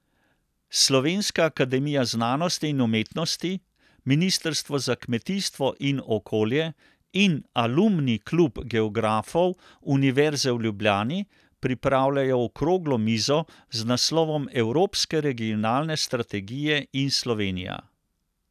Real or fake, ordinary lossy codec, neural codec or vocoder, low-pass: real; none; none; 14.4 kHz